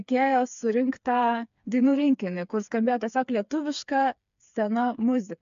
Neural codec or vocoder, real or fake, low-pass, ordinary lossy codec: codec, 16 kHz, 4 kbps, FreqCodec, smaller model; fake; 7.2 kHz; MP3, 64 kbps